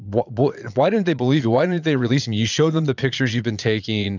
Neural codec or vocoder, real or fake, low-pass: vocoder, 22.05 kHz, 80 mel bands, Vocos; fake; 7.2 kHz